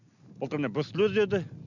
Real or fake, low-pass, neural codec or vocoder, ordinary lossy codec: real; 7.2 kHz; none; none